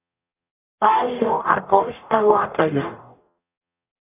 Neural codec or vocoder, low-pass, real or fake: codec, 44.1 kHz, 0.9 kbps, DAC; 3.6 kHz; fake